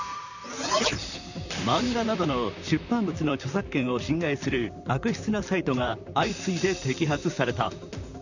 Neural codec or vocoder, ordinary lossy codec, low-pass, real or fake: vocoder, 44.1 kHz, 128 mel bands, Pupu-Vocoder; none; 7.2 kHz; fake